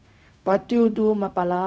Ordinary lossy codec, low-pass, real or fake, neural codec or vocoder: none; none; fake; codec, 16 kHz, 0.4 kbps, LongCat-Audio-Codec